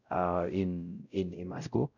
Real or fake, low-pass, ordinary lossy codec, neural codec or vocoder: fake; 7.2 kHz; none; codec, 16 kHz, 0.5 kbps, X-Codec, WavLM features, trained on Multilingual LibriSpeech